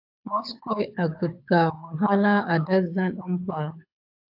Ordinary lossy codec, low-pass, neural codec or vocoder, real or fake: AAC, 48 kbps; 5.4 kHz; codec, 24 kHz, 6 kbps, HILCodec; fake